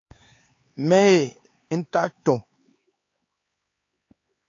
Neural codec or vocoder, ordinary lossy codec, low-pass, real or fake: codec, 16 kHz, 4 kbps, X-Codec, HuBERT features, trained on LibriSpeech; AAC, 32 kbps; 7.2 kHz; fake